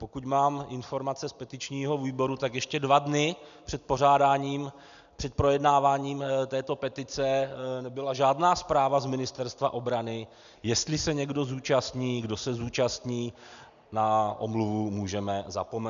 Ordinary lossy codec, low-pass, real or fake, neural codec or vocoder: MP3, 96 kbps; 7.2 kHz; real; none